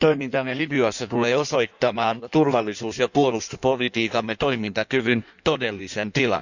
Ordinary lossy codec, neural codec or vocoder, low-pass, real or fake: none; codec, 16 kHz in and 24 kHz out, 1.1 kbps, FireRedTTS-2 codec; 7.2 kHz; fake